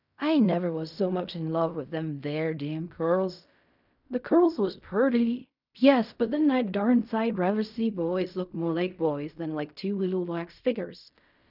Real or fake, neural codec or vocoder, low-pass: fake; codec, 16 kHz in and 24 kHz out, 0.4 kbps, LongCat-Audio-Codec, fine tuned four codebook decoder; 5.4 kHz